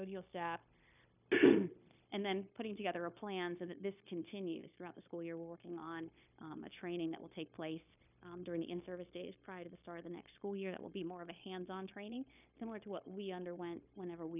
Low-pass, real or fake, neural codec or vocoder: 3.6 kHz; fake; codec, 16 kHz, 0.9 kbps, LongCat-Audio-Codec